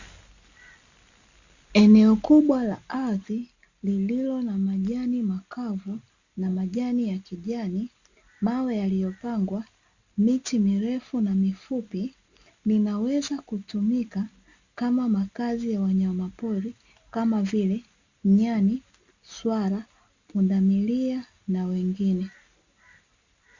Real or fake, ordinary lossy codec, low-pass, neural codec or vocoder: real; Opus, 64 kbps; 7.2 kHz; none